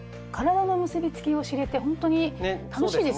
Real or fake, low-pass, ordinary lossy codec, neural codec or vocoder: real; none; none; none